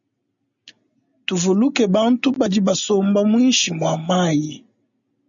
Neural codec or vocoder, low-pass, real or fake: none; 7.2 kHz; real